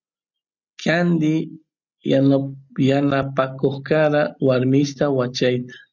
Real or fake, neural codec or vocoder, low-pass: real; none; 7.2 kHz